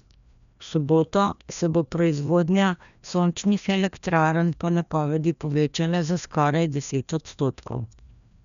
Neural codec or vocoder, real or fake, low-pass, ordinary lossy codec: codec, 16 kHz, 1 kbps, FreqCodec, larger model; fake; 7.2 kHz; none